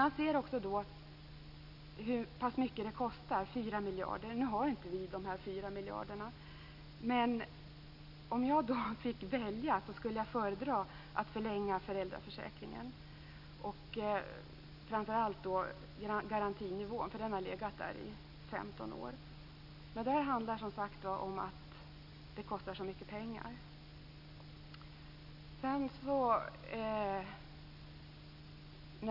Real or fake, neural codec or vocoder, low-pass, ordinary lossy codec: real; none; 5.4 kHz; none